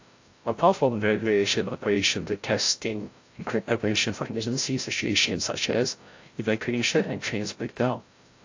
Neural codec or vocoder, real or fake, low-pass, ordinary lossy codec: codec, 16 kHz, 0.5 kbps, FreqCodec, larger model; fake; 7.2 kHz; AAC, 48 kbps